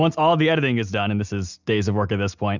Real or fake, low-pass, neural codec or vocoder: real; 7.2 kHz; none